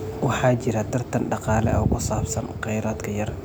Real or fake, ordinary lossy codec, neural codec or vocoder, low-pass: real; none; none; none